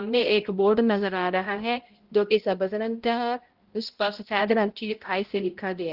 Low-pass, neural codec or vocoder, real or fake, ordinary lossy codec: 5.4 kHz; codec, 16 kHz, 0.5 kbps, X-Codec, HuBERT features, trained on balanced general audio; fake; Opus, 16 kbps